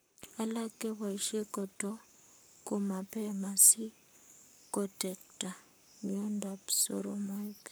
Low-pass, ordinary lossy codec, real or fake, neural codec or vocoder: none; none; fake; codec, 44.1 kHz, 7.8 kbps, Pupu-Codec